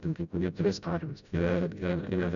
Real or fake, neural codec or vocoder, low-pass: fake; codec, 16 kHz, 0.5 kbps, FreqCodec, smaller model; 7.2 kHz